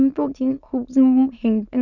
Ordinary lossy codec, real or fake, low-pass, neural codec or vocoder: none; fake; 7.2 kHz; autoencoder, 22.05 kHz, a latent of 192 numbers a frame, VITS, trained on many speakers